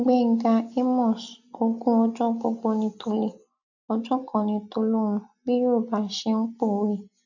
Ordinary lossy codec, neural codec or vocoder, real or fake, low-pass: none; none; real; 7.2 kHz